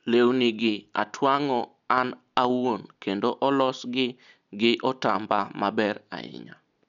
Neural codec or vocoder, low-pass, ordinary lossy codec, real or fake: none; 7.2 kHz; none; real